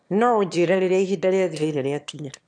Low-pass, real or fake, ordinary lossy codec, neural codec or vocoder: 9.9 kHz; fake; none; autoencoder, 22.05 kHz, a latent of 192 numbers a frame, VITS, trained on one speaker